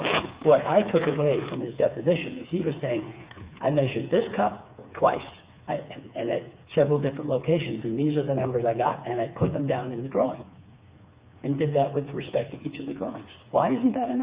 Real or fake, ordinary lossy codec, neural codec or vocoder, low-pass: fake; Opus, 64 kbps; codec, 16 kHz, 4 kbps, FreqCodec, smaller model; 3.6 kHz